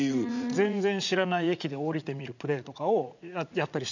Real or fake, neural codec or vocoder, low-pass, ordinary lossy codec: fake; vocoder, 44.1 kHz, 80 mel bands, Vocos; 7.2 kHz; none